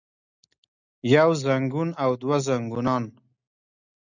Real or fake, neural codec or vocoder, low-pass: real; none; 7.2 kHz